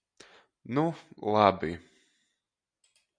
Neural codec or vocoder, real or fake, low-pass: none; real; 9.9 kHz